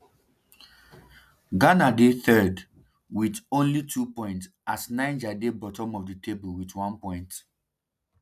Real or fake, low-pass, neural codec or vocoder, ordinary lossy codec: real; 14.4 kHz; none; none